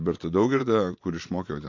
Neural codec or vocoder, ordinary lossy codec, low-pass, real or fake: vocoder, 24 kHz, 100 mel bands, Vocos; AAC, 48 kbps; 7.2 kHz; fake